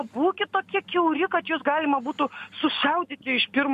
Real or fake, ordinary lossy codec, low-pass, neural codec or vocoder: real; MP3, 64 kbps; 14.4 kHz; none